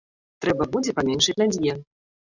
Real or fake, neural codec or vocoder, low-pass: real; none; 7.2 kHz